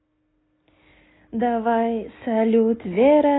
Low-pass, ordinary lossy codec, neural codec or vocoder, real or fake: 7.2 kHz; AAC, 16 kbps; none; real